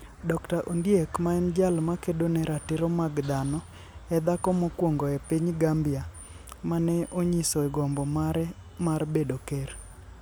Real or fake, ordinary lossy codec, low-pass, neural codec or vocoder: real; none; none; none